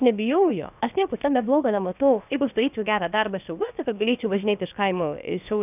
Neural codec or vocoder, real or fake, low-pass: codec, 16 kHz, about 1 kbps, DyCAST, with the encoder's durations; fake; 3.6 kHz